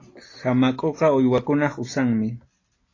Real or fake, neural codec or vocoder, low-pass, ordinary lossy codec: real; none; 7.2 kHz; AAC, 32 kbps